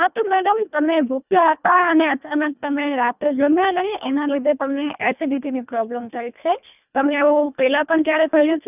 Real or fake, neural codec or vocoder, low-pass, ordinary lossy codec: fake; codec, 24 kHz, 1.5 kbps, HILCodec; 3.6 kHz; none